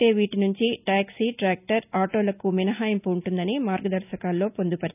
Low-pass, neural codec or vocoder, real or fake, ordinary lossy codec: 3.6 kHz; none; real; none